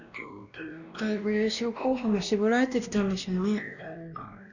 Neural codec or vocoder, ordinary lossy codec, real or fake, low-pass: codec, 16 kHz, 1 kbps, X-Codec, WavLM features, trained on Multilingual LibriSpeech; none; fake; 7.2 kHz